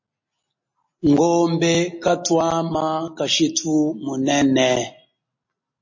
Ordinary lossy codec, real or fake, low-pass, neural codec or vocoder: MP3, 32 kbps; real; 7.2 kHz; none